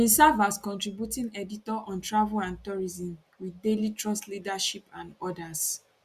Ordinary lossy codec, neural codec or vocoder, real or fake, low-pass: Opus, 64 kbps; none; real; 14.4 kHz